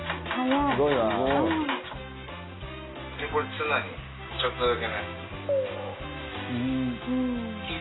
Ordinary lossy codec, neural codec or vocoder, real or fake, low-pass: AAC, 16 kbps; none; real; 7.2 kHz